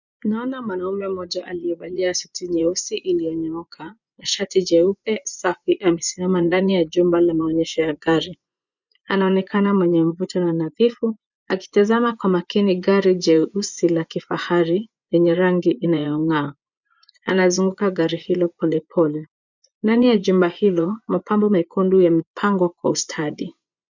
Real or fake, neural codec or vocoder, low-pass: fake; vocoder, 24 kHz, 100 mel bands, Vocos; 7.2 kHz